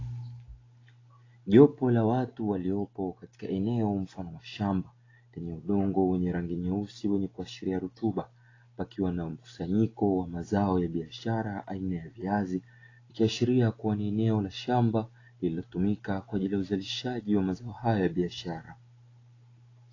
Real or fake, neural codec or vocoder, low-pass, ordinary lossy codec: real; none; 7.2 kHz; AAC, 32 kbps